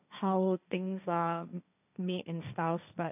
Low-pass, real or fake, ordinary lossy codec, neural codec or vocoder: 3.6 kHz; fake; none; codec, 16 kHz, 1.1 kbps, Voila-Tokenizer